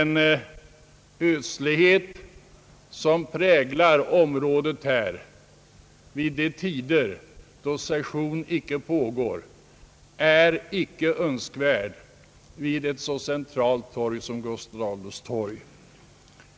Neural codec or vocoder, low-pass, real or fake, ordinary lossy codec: none; none; real; none